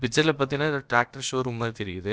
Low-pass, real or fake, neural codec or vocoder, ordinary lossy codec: none; fake; codec, 16 kHz, about 1 kbps, DyCAST, with the encoder's durations; none